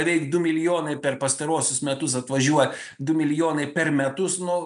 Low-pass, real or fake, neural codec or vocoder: 10.8 kHz; real; none